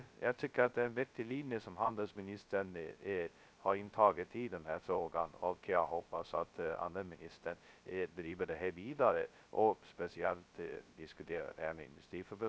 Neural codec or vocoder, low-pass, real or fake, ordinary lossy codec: codec, 16 kHz, 0.2 kbps, FocalCodec; none; fake; none